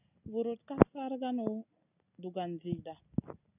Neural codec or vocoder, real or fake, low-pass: none; real; 3.6 kHz